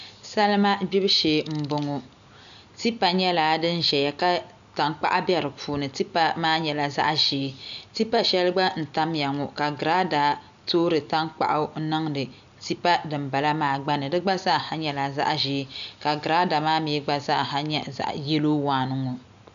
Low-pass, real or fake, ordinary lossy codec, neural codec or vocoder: 7.2 kHz; real; AAC, 96 kbps; none